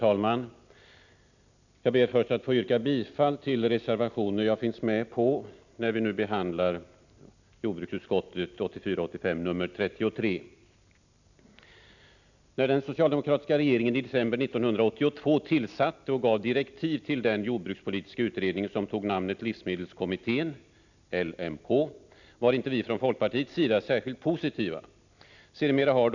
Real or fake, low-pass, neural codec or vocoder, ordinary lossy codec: real; 7.2 kHz; none; none